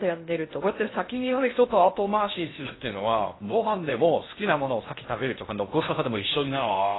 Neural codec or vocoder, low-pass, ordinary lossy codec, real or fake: codec, 16 kHz in and 24 kHz out, 0.6 kbps, FocalCodec, streaming, 2048 codes; 7.2 kHz; AAC, 16 kbps; fake